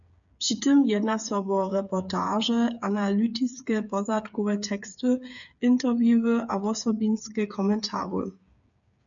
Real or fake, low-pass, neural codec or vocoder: fake; 7.2 kHz; codec, 16 kHz, 8 kbps, FreqCodec, smaller model